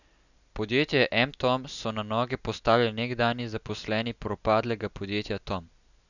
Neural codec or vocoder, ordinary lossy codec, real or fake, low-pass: none; none; real; 7.2 kHz